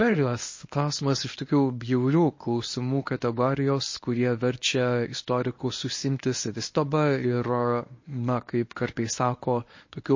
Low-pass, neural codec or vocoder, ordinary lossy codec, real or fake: 7.2 kHz; codec, 24 kHz, 0.9 kbps, WavTokenizer, medium speech release version 1; MP3, 32 kbps; fake